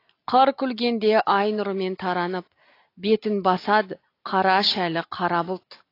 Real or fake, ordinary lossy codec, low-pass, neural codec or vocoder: real; AAC, 32 kbps; 5.4 kHz; none